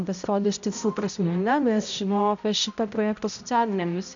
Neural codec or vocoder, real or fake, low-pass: codec, 16 kHz, 0.5 kbps, X-Codec, HuBERT features, trained on balanced general audio; fake; 7.2 kHz